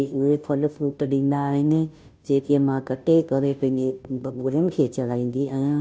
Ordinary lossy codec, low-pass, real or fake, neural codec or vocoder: none; none; fake; codec, 16 kHz, 0.5 kbps, FunCodec, trained on Chinese and English, 25 frames a second